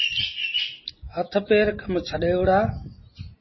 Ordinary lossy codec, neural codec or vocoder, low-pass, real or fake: MP3, 24 kbps; none; 7.2 kHz; real